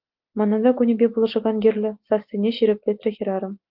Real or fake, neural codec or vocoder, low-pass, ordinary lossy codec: real; none; 5.4 kHz; Opus, 24 kbps